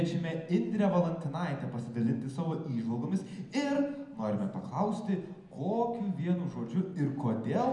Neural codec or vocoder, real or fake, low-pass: none; real; 10.8 kHz